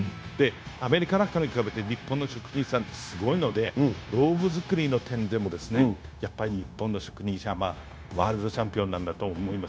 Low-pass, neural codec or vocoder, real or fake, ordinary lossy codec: none; codec, 16 kHz, 0.9 kbps, LongCat-Audio-Codec; fake; none